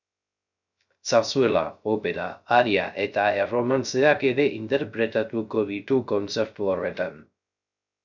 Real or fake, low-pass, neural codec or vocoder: fake; 7.2 kHz; codec, 16 kHz, 0.3 kbps, FocalCodec